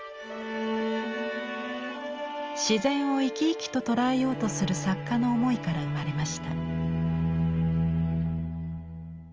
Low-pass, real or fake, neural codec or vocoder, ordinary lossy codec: 7.2 kHz; real; none; Opus, 24 kbps